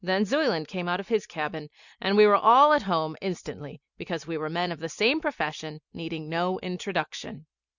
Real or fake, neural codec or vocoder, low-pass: real; none; 7.2 kHz